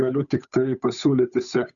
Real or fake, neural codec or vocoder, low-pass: fake; codec, 16 kHz, 8 kbps, FunCodec, trained on Chinese and English, 25 frames a second; 7.2 kHz